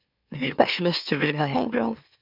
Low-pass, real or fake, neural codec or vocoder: 5.4 kHz; fake; autoencoder, 44.1 kHz, a latent of 192 numbers a frame, MeloTTS